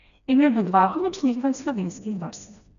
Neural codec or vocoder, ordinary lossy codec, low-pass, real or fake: codec, 16 kHz, 1 kbps, FreqCodec, smaller model; none; 7.2 kHz; fake